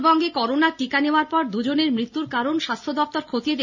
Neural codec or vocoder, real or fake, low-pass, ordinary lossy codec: none; real; 7.2 kHz; none